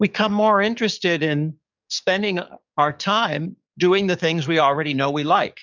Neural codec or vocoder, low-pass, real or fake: codec, 44.1 kHz, 7.8 kbps, DAC; 7.2 kHz; fake